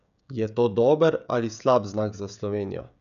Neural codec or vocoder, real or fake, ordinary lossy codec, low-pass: codec, 16 kHz, 16 kbps, FreqCodec, smaller model; fake; none; 7.2 kHz